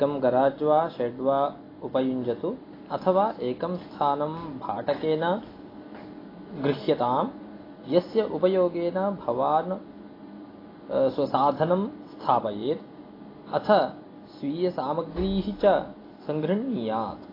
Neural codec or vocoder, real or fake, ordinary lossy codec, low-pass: none; real; AAC, 24 kbps; 5.4 kHz